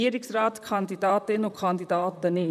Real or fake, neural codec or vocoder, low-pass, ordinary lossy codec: fake; vocoder, 44.1 kHz, 128 mel bands, Pupu-Vocoder; 14.4 kHz; none